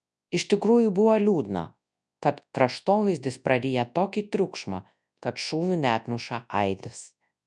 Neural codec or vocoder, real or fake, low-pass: codec, 24 kHz, 0.9 kbps, WavTokenizer, large speech release; fake; 10.8 kHz